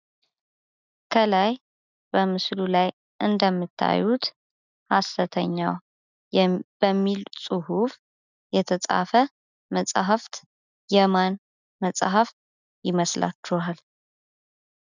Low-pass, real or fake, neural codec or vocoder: 7.2 kHz; real; none